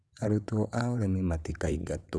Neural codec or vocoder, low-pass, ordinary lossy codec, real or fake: vocoder, 22.05 kHz, 80 mel bands, WaveNeXt; none; none; fake